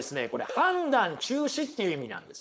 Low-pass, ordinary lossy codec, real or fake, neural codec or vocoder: none; none; fake; codec, 16 kHz, 4.8 kbps, FACodec